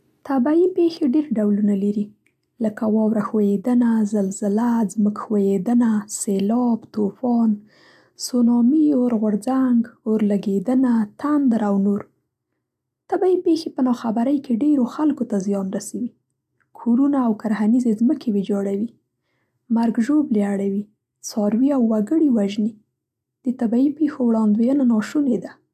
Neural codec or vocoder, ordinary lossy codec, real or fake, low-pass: none; AAC, 96 kbps; real; 14.4 kHz